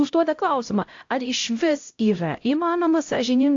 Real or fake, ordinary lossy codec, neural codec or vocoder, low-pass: fake; AAC, 48 kbps; codec, 16 kHz, 0.5 kbps, X-Codec, HuBERT features, trained on LibriSpeech; 7.2 kHz